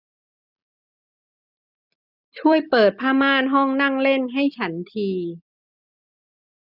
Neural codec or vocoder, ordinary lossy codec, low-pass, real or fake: none; none; 5.4 kHz; real